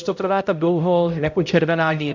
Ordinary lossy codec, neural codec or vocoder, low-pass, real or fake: MP3, 64 kbps; codec, 16 kHz, 0.5 kbps, X-Codec, HuBERT features, trained on balanced general audio; 7.2 kHz; fake